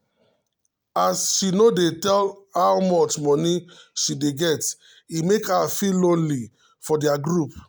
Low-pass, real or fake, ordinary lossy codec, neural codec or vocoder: none; real; none; none